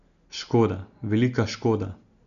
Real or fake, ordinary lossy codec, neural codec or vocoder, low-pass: real; none; none; 7.2 kHz